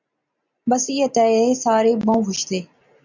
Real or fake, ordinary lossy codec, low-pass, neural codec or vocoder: real; MP3, 64 kbps; 7.2 kHz; none